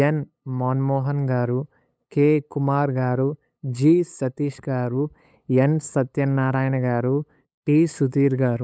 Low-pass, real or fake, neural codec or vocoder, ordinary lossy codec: none; fake; codec, 16 kHz, 8 kbps, FunCodec, trained on LibriTTS, 25 frames a second; none